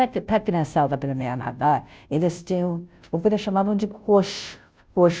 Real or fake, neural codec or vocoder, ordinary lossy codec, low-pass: fake; codec, 16 kHz, 0.5 kbps, FunCodec, trained on Chinese and English, 25 frames a second; none; none